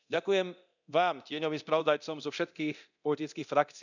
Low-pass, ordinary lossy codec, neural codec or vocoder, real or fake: 7.2 kHz; none; codec, 24 kHz, 0.9 kbps, DualCodec; fake